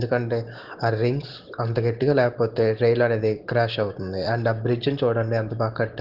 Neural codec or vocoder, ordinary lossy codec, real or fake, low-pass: none; Opus, 16 kbps; real; 5.4 kHz